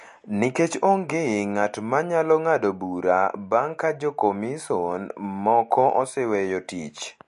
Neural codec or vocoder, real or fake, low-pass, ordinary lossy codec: none; real; 14.4 kHz; MP3, 48 kbps